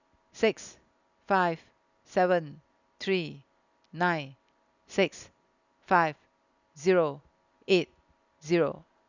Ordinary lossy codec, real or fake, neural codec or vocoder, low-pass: none; real; none; 7.2 kHz